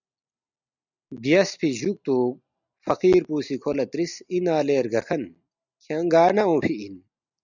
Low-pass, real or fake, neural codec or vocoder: 7.2 kHz; real; none